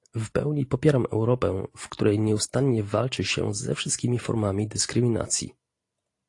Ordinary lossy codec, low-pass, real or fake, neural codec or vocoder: AAC, 48 kbps; 10.8 kHz; real; none